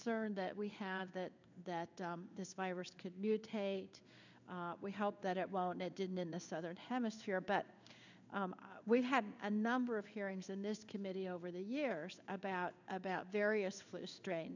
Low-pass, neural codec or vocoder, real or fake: 7.2 kHz; codec, 16 kHz in and 24 kHz out, 1 kbps, XY-Tokenizer; fake